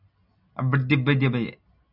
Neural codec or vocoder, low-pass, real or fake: none; 5.4 kHz; real